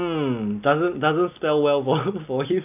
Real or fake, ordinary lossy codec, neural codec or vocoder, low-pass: real; none; none; 3.6 kHz